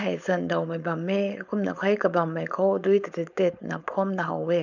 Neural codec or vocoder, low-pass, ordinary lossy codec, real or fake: codec, 16 kHz, 4.8 kbps, FACodec; 7.2 kHz; none; fake